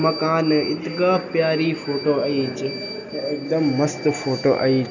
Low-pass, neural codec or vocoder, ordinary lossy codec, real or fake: 7.2 kHz; none; none; real